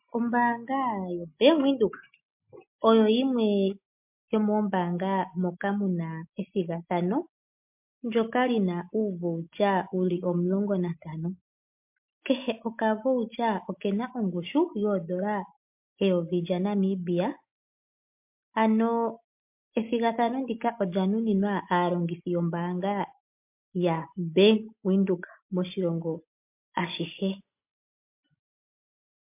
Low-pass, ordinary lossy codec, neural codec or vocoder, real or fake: 3.6 kHz; MP3, 32 kbps; none; real